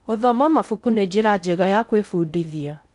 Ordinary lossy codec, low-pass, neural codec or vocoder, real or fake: none; 10.8 kHz; codec, 16 kHz in and 24 kHz out, 0.6 kbps, FocalCodec, streaming, 4096 codes; fake